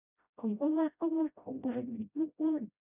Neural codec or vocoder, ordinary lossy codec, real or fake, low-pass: codec, 16 kHz, 0.5 kbps, FreqCodec, smaller model; none; fake; 3.6 kHz